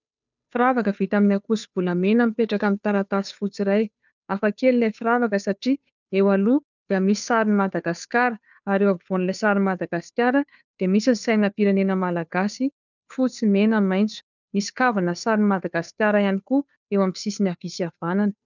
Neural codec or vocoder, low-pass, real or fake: codec, 16 kHz, 2 kbps, FunCodec, trained on Chinese and English, 25 frames a second; 7.2 kHz; fake